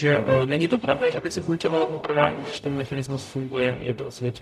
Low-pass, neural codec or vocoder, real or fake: 14.4 kHz; codec, 44.1 kHz, 0.9 kbps, DAC; fake